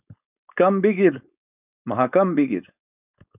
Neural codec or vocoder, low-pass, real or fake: codec, 16 kHz, 4.8 kbps, FACodec; 3.6 kHz; fake